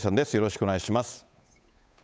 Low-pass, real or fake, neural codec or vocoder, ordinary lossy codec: none; fake; codec, 16 kHz, 8 kbps, FunCodec, trained on Chinese and English, 25 frames a second; none